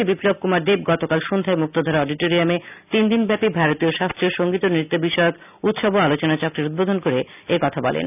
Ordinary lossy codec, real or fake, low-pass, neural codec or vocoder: none; real; 3.6 kHz; none